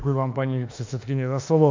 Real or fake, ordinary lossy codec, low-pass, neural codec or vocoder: fake; MP3, 48 kbps; 7.2 kHz; autoencoder, 48 kHz, 32 numbers a frame, DAC-VAE, trained on Japanese speech